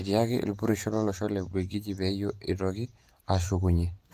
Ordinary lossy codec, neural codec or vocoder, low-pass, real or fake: Opus, 32 kbps; vocoder, 48 kHz, 128 mel bands, Vocos; 14.4 kHz; fake